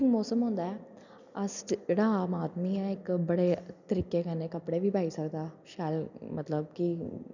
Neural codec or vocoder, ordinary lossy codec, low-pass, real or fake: none; none; 7.2 kHz; real